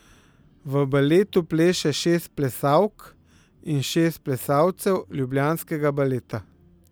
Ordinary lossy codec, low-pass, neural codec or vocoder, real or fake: none; none; none; real